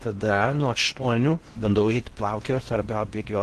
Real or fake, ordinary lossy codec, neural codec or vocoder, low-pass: fake; Opus, 16 kbps; codec, 16 kHz in and 24 kHz out, 0.6 kbps, FocalCodec, streaming, 4096 codes; 10.8 kHz